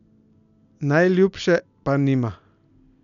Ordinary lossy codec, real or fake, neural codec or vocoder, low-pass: none; real; none; 7.2 kHz